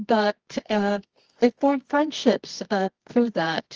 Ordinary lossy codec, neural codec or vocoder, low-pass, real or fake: Opus, 24 kbps; codec, 24 kHz, 0.9 kbps, WavTokenizer, medium music audio release; 7.2 kHz; fake